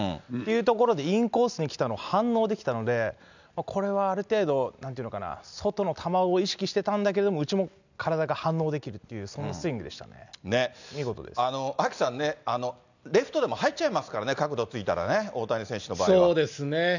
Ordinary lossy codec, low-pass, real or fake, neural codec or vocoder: none; 7.2 kHz; real; none